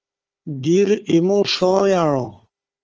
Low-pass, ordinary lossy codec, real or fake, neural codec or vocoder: 7.2 kHz; Opus, 24 kbps; fake; codec, 16 kHz, 4 kbps, FunCodec, trained on Chinese and English, 50 frames a second